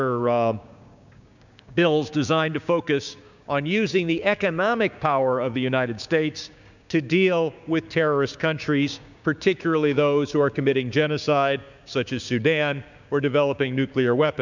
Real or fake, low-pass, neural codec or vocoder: fake; 7.2 kHz; codec, 16 kHz, 6 kbps, DAC